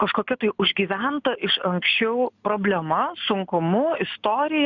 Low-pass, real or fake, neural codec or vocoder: 7.2 kHz; real; none